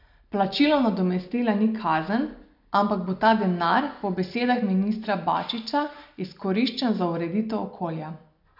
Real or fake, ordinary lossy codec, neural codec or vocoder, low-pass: real; none; none; 5.4 kHz